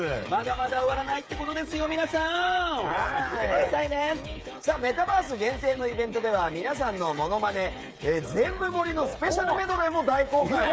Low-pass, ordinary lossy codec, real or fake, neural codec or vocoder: none; none; fake; codec, 16 kHz, 8 kbps, FreqCodec, smaller model